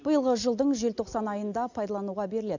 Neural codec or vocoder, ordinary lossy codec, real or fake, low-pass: none; none; real; 7.2 kHz